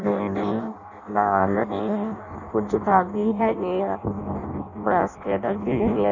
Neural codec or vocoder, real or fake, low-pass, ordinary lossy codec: codec, 16 kHz in and 24 kHz out, 0.6 kbps, FireRedTTS-2 codec; fake; 7.2 kHz; none